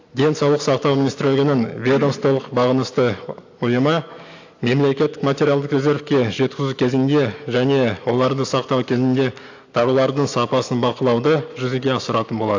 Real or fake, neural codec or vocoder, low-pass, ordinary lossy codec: real; none; 7.2 kHz; AAC, 48 kbps